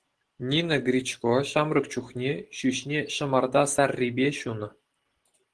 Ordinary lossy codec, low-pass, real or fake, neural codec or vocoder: Opus, 16 kbps; 10.8 kHz; real; none